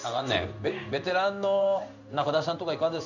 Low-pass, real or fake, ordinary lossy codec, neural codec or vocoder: 7.2 kHz; fake; none; codec, 16 kHz in and 24 kHz out, 1 kbps, XY-Tokenizer